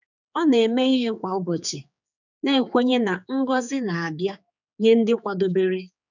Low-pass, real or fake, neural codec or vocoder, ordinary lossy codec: 7.2 kHz; fake; codec, 16 kHz, 4 kbps, X-Codec, HuBERT features, trained on general audio; none